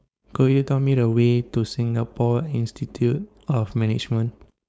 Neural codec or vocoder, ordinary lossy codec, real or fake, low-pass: codec, 16 kHz, 4.8 kbps, FACodec; none; fake; none